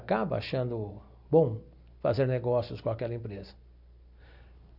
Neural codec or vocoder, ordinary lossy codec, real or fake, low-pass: none; none; real; 5.4 kHz